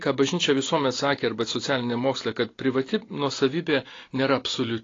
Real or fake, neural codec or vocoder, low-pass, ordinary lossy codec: real; none; 7.2 kHz; AAC, 32 kbps